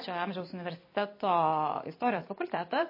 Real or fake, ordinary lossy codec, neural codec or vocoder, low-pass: fake; MP3, 24 kbps; vocoder, 44.1 kHz, 128 mel bands every 256 samples, BigVGAN v2; 5.4 kHz